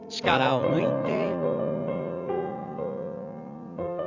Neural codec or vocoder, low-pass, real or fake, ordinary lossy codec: vocoder, 44.1 kHz, 80 mel bands, Vocos; 7.2 kHz; fake; none